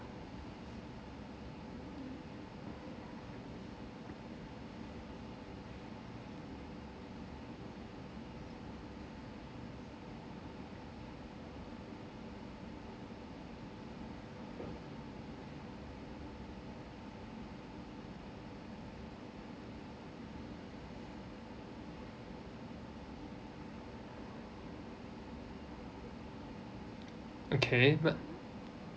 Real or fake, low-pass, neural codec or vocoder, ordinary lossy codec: real; none; none; none